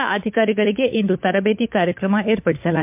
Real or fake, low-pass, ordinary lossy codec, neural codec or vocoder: fake; 3.6 kHz; MP3, 32 kbps; codec, 16 kHz, 4 kbps, FunCodec, trained on LibriTTS, 50 frames a second